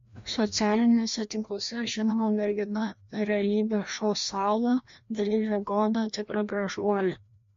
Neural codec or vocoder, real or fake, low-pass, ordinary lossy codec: codec, 16 kHz, 1 kbps, FreqCodec, larger model; fake; 7.2 kHz; MP3, 48 kbps